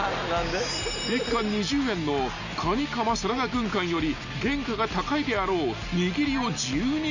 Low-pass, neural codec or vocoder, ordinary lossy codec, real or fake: 7.2 kHz; none; none; real